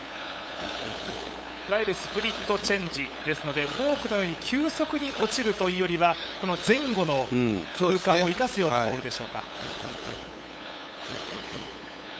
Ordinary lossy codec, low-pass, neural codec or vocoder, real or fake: none; none; codec, 16 kHz, 8 kbps, FunCodec, trained on LibriTTS, 25 frames a second; fake